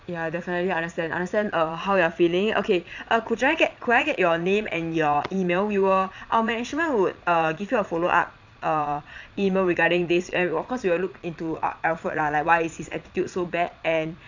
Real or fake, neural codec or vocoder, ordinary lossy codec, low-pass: fake; vocoder, 22.05 kHz, 80 mel bands, Vocos; none; 7.2 kHz